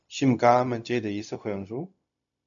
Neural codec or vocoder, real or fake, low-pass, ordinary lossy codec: codec, 16 kHz, 0.4 kbps, LongCat-Audio-Codec; fake; 7.2 kHz; MP3, 96 kbps